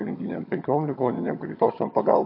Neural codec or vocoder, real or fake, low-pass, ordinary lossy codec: vocoder, 22.05 kHz, 80 mel bands, HiFi-GAN; fake; 5.4 kHz; MP3, 32 kbps